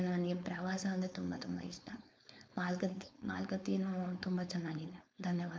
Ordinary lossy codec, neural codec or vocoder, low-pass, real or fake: none; codec, 16 kHz, 4.8 kbps, FACodec; none; fake